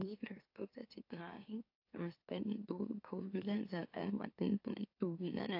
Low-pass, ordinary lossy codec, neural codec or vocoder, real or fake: 5.4 kHz; AAC, 32 kbps; autoencoder, 44.1 kHz, a latent of 192 numbers a frame, MeloTTS; fake